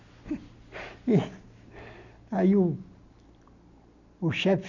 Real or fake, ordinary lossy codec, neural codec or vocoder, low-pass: real; none; none; 7.2 kHz